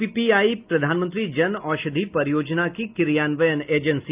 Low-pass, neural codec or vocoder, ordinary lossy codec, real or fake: 3.6 kHz; none; Opus, 24 kbps; real